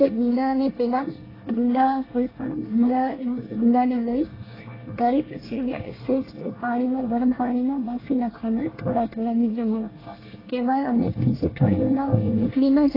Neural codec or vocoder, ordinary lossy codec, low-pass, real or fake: codec, 24 kHz, 1 kbps, SNAC; AAC, 24 kbps; 5.4 kHz; fake